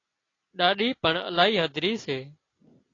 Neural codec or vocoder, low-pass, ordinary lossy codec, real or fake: none; 7.2 kHz; AAC, 32 kbps; real